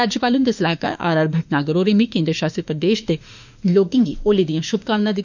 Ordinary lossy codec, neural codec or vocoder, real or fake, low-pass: none; autoencoder, 48 kHz, 32 numbers a frame, DAC-VAE, trained on Japanese speech; fake; 7.2 kHz